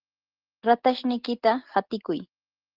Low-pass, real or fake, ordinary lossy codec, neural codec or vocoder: 5.4 kHz; real; Opus, 16 kbps; none